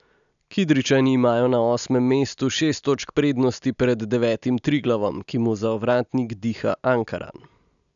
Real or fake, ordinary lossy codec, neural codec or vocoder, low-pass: real; none; none; 7.2 kHz